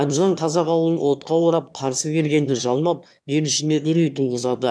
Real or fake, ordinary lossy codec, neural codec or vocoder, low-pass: fake; none; autoencoder, 22.05 kHz, a latent of 192 numbers a frame, VITS, trained on one speaker; none